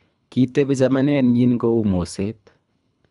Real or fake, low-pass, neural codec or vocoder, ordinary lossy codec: fake; 10.8 kHz; codec, 24 kHz, 3 kbps, HILCodec; none